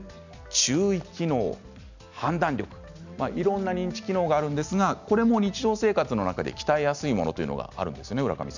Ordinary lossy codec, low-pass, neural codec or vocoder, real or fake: none; 7.2 kHz; none; real